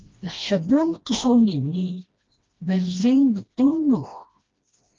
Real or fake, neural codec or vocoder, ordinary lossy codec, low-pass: fake; codec, 16 kHz, 1 kbps, FreqCodec, smaller model; Opus, 24 kbps; 7.2 kHz